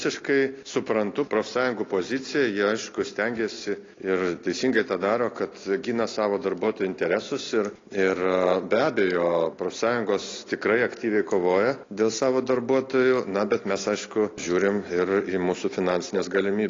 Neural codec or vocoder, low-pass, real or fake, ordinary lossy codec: none; 7.2 kHz; real; AAC, 32 kbps